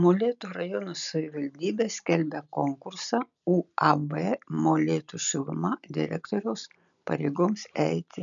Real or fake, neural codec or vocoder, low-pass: real; none; 7.2 kHz